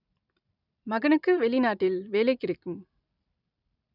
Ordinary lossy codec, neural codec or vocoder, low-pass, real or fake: none; vocoder, 44.1 kHz, 128 mel bands every 256 samples, BigVGAN v2; 5.4 kHz; fake